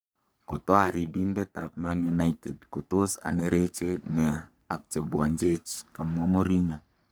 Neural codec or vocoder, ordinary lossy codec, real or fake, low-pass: codec, 44.1 kHz, 3.4 kbps, Pupu-Codec; none; fake; none